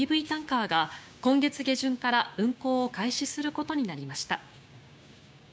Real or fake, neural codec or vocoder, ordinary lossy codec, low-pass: fake; codec, 16 kHz, 6 kbps, DAC; none; none